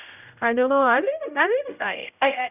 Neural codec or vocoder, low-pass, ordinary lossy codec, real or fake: codec, 16 kHz, 0.5 kbps, X-Codec, HuBERT features, trained on general audio; 3.6 kHz; none; fake